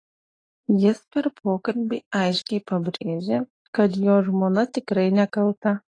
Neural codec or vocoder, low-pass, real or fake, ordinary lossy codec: none; 9.9 kHz; real; AAC, 32 kbps